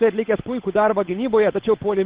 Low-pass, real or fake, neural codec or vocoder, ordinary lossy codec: 3.6 kHz; fake; codec, 16 kHz, 8 kbps, FunCodec, trained on Chinese and English, 25 frames a second; Opus, 16 kbps